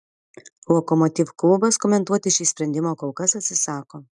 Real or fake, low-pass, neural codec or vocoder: real; 10.8 kHz; none